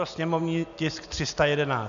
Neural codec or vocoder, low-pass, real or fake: none; 7.2 kHz; real